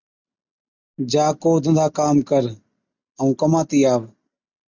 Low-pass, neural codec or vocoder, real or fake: 7.2 kHz; none; real